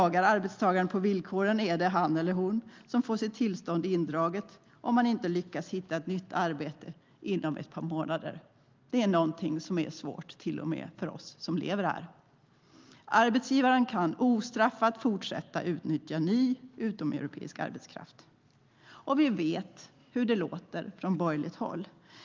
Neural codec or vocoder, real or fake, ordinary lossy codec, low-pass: none; real; Opus, 24 kbps; 7.2 kHz